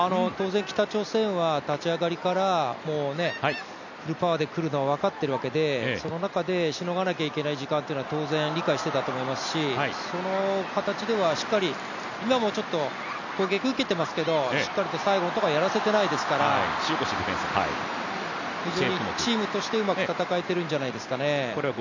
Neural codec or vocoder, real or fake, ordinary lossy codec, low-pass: none; real; none; 7.2 kHz